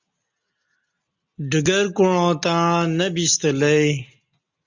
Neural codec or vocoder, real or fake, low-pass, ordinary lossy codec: none; real; 7.2 kHz; Opus, 64 kbps